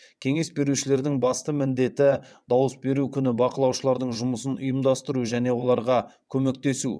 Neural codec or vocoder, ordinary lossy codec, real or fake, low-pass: vocoder, 22.05 kHz, 80 mel bands, WaveNeXt; none; fake; none